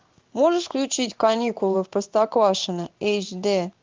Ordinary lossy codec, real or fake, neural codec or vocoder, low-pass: Opus, 24 kbps; fake; codec, 16 kHz in and 24 kHz out, 1 kbps, XY-Tokenizer; 7.2 kHz